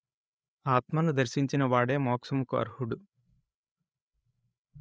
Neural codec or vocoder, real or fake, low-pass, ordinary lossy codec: codec, 16 kHz, 8 kbps, FreqCodec, larger model; fake; none; none